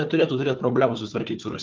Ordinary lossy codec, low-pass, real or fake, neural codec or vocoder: Opus, 32 kbps; 7.2 kHz; fake; codec, 16 kHz, 4 kbps, FunCodec, trained on Chinese and English, 50 frames a second